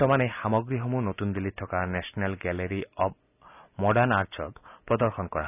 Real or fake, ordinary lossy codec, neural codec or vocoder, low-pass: real; none; none; 3.6 kHz